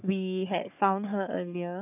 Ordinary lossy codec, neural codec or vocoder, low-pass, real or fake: none; codec, 44.1 kHz, 3.4 kbps, Pupu-Codec; 3.6 kHz; fake